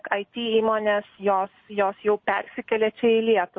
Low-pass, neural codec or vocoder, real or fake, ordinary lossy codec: 7.2 kHz; none; real; MP3, 32 kbps